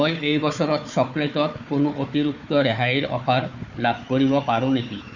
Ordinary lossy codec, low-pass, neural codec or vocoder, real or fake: none; 7.2 kHz; codec, 16 kHz, 4 kbps, FunCodec, trained on Chinese and English, 50 frames a second; fake